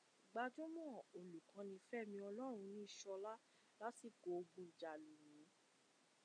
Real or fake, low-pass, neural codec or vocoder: real; 9.9 kHz; none